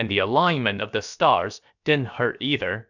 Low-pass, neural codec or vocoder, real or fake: 7.2 kHz; codec, 16 kHz, about 1 kbps, DyCAST, with the encoder's durations; fake